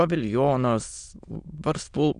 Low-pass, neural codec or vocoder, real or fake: 9.9 kHz; autoencoder, 22.05 kHz, a latent of 192 numbers a frame, VITS, trained on many speakers; fake